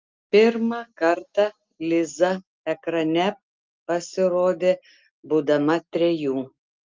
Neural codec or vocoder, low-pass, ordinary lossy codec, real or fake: none; 7.2 kHz; Opus, 24 kbps; real